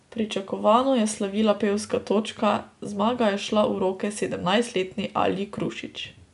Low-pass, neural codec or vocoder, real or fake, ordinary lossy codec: 10.8 kHz; none; real; none